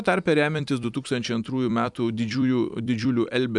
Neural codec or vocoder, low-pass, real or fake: vocoder, 44.1 kHz, 128 mel bands every 256 samples, BigVGAN v2; 10.8 kHz; fake